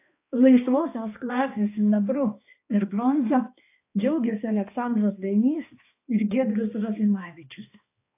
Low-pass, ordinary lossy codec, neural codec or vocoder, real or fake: 3.6 kHz; AAC, 24 kbps; codec, 16 kHz, 2 kbps, X-Codec, HuBERT features, trained on balanced general audio; fake